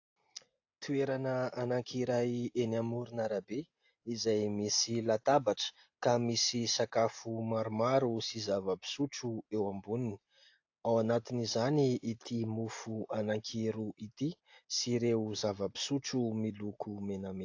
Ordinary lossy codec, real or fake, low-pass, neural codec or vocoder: AAC, 48 kbps; real; 7.2 kHz; none